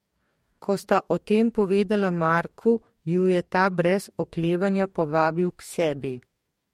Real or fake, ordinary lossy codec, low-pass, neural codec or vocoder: fake; MP3, 64 kbps; 19.8 kHz; codec, 44.1 kHz, 2.6 kbps, DAC